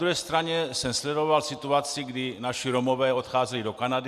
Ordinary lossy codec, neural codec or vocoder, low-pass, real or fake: Opus, 64 kbps; none; 14.4 kHz; real